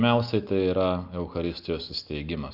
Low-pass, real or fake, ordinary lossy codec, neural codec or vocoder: 5.4 kHz; real; Opus, 24 kbps; none